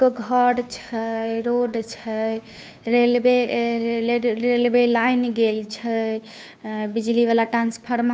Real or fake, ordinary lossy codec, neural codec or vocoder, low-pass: fake; none; codec, 16 kHz, 2 kbps, FunCodec, trained on Chinese and English, 25 frames a second; none